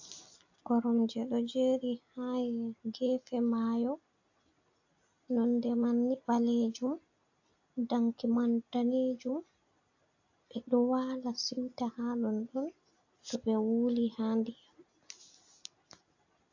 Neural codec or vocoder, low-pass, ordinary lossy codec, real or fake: none; 7.2 kHz; AAC, 48 kbps; real